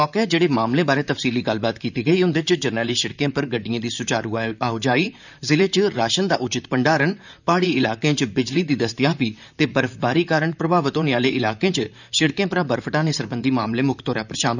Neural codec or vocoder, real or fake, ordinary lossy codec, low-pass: vocoder, 44.1 kHz, 128 mel bands, Pupu-Vocoder; fake; none; 7.2 kHz